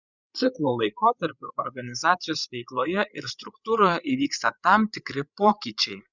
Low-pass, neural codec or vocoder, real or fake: 7.2 kHz; codec, 16 kHz, 8 kbps, FreqCodec, larger model; fake